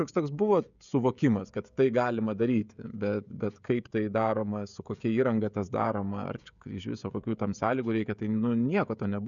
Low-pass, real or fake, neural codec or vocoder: 7.2 kHz; fake; codec, 16 kHz, 16 kbps, FreqCodec, smaller model